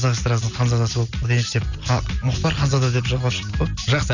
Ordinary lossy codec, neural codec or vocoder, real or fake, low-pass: none; none; real; 7.2 kHz